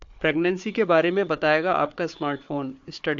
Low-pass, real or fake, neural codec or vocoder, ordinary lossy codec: 7.2 kHz; fake; codec, 16 kHz, 4 kbps, FunCodec, trained on Chinese and English, 50 frames a second; AAC, 64 kbps